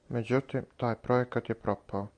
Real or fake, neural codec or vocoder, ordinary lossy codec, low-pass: real; none; MP3, 64 kbps; 9.9 kHz